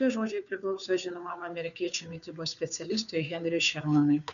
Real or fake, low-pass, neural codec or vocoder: fake; 7.2 kHz; codec, 16 kHz, 2 kbps, FunCodec, trained on Chinese and English, 25 frames a second